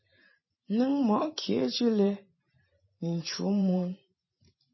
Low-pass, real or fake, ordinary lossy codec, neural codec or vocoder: 7.2 kHz; fake; MP3, 24 kbps; vocoder, 44.1 kHz, 128 mel bands every 512 samples, BigVGAN v2